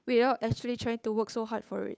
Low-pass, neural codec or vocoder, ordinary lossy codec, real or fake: none; codec, 16 kHz, 8 kbps, FunCodec, trained on Chinese and English, 25 frames a second; none; fake